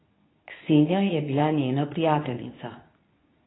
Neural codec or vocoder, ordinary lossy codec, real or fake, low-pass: codec, 24 kHz, 0.9 kbps, WavTokenizer, medium speech release version 2; AAC, 16 kbps; fake; 7.2 kHz